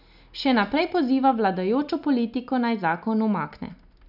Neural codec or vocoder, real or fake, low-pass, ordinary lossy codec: none; real; 5.4 kHz; none